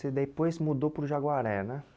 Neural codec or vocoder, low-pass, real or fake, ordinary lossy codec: none; none; real; none